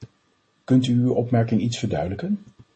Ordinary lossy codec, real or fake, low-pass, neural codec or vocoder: MP3, 32 kbps; real; 9.9 kHz; none